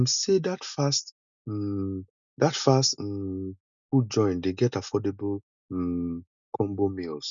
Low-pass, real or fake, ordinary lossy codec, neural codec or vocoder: 7.2 kHz; real; none; none